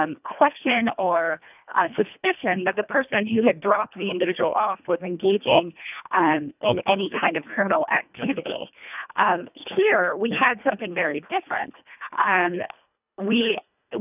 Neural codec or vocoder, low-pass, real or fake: codec, 24 kHz, 1.5 kbps, HILCodec; 3.6 kHz; fake